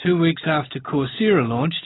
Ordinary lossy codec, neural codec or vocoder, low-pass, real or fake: AAC, 16 kbps; none; 7.2 kHz; real